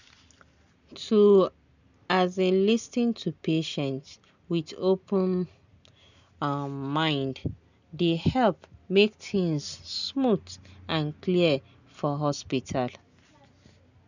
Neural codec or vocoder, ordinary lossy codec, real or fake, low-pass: none; none; real; 7.2 kHz